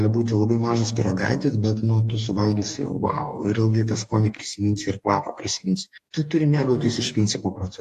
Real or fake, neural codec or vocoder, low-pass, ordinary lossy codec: fake; codec, 44.1 kHz, 2.6 kbps, DAC; 14.4 kHz; AAC, 64 kbps